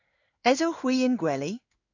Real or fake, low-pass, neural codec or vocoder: fake; 7.2 kHz; codec, 16 kHz in and 24 kHz out, 1 kbps, XY-Tokenizer